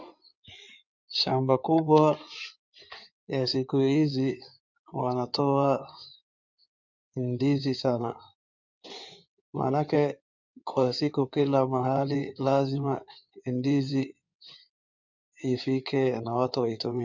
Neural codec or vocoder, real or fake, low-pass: codec, 16 kHz in and 24 kHz out, 2.2 kbps, FireRedTTS-2 codec; fake; 7.2 kHz